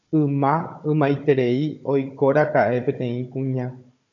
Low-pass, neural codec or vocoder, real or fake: 7.2 kHz; codec, 16 kHz, 16 kbps, FunCodec, trained on Chinese and English, 50 frames a second; fake